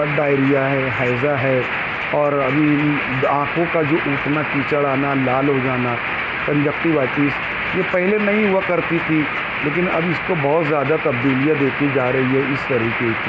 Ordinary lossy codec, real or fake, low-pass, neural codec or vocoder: none; real; none; none